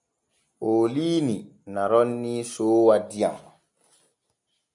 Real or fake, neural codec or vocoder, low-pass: real; none; 10.8 kHz